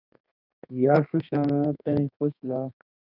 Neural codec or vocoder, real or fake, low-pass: codec, 32 kHz, 1.9 kbps, SNAC; fake; 5.4 kHz